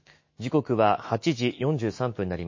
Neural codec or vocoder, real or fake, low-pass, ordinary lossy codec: autoencoder, 48 kHz, 128 numbers a frame, DAC-VAE, trained on Japanese speech; fake; 7.2 kHz; MP3, 32 kbps